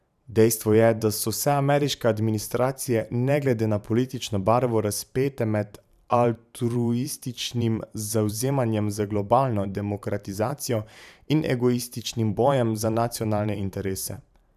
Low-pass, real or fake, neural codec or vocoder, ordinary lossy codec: 14.4 kHz; fake; vocoder, 44.1 kHz, 128 mel bands every 512 samples, BigVGAN v2; none